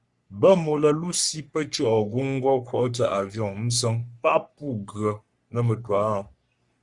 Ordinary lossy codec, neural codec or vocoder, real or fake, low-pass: Opus, 64 kbps; codec, 44.1 kHz, 3.4 kbps, Pupu-Codec; fake; 10.8 kHz